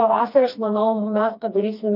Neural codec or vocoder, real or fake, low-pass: codec, 16 kHz, 2 kbps, FreqCodec, smaller model; fake; 5.4 kHz